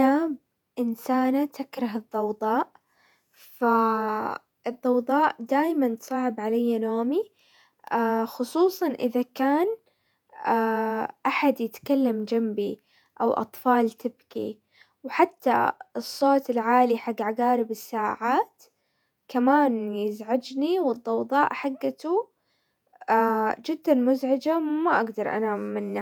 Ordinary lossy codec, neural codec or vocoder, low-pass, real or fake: none; vocoder, 48 kHz, 128 mel bands, Vocos; 19.8 kHz; fake